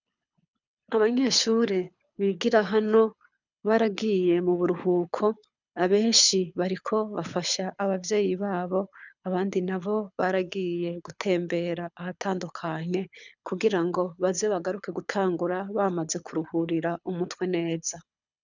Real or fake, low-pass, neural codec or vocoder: fake; 7.2 kHz; codec, 24 kHz, 6 kbps, HILCodec